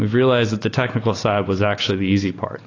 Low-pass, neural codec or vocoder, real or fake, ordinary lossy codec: 7.2 kHz; none; real; AAC, 32 kbps